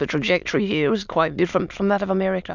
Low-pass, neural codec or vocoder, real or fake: 7.2 kHz; autoencoder, 22.05 kHz, a latent of 192 numbers a frame, VITS, trained on many speakers; fake